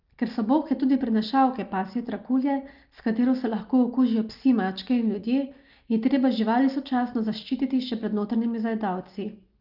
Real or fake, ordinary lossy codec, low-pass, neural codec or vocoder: real; Opus, 24 kbps; 5.4 kHz; none